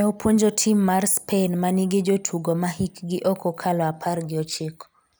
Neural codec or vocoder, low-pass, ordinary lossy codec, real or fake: none; none; none; real